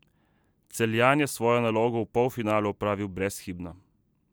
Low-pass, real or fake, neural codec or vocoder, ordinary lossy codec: none; real; none; none